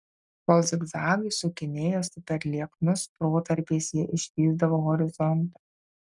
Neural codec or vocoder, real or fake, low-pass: none; real; 10.8 kHz